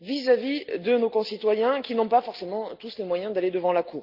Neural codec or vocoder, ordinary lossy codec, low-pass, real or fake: none; Opus, 32 kbps; 5.4 kHz; real